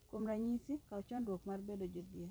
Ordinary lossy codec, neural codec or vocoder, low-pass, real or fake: none; vocoder, 44.1 kHz, 128 mel bands every 256 samples, BigVGAN v2; none; fake